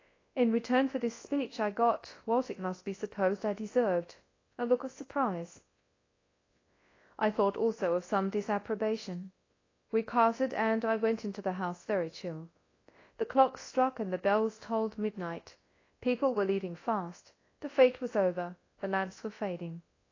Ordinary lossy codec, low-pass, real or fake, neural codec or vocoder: AAC, 32 kbps; 7.2 kHz; fake; codec, 24 kHz, 0.9 kbps, WavTokenizer, large speech release